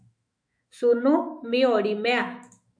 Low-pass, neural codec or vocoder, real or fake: 9.9 kHz; autoencoder, 48 kHz, 128 numbers a frame, DAC-VAE, trained on Japanese speech; fake